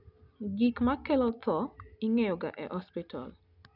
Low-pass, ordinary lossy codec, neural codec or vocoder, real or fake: 5.4 kHz; none; none; real